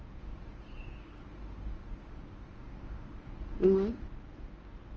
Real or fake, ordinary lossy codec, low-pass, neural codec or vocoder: fake; Opus, 24 kbps; 7.2 kHz; codec, 24 kHz, 0.9 kbps, WavTokenizer, medium speech release version 1